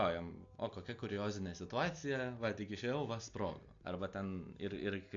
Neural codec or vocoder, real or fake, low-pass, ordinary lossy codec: none; real; 7.2 kHz; AAC, 64 kbps